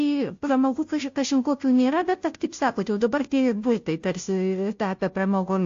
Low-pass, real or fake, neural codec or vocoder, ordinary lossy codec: 7.2 kHz; fake; codec, 16 kHz, 0.5 kbps, FunCodec, trained on Chinese and English, 25 frames a second; AAC, 48 kbps